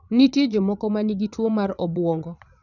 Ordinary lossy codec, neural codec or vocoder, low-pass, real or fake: none; vocoder, 22.05 kHz, 80 mel bands, Vocos; 7.2 kHz; fake